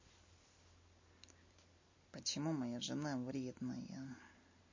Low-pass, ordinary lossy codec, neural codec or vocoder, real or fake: 7.2 kHz; MP3, 32 kbps; none; real